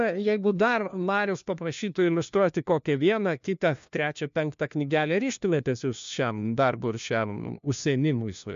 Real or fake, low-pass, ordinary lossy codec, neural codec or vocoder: fake; 7.2 kHz; MP3, 64 kbps; codec, 16 kHz, 1 kbps, FunCodec, trained on LibriTTS, 50 frames a second